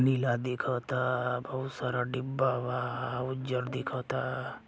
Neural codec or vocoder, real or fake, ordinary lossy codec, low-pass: none; real; none; none